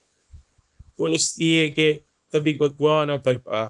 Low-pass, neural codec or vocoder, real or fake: 10.8 kHz; codec, 24 kHz, 0.9 kbps, WavTokenizer, small release; fake